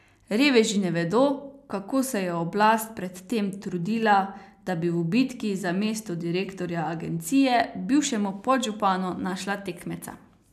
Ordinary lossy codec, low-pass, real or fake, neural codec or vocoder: none; 14.4 kHz; real; none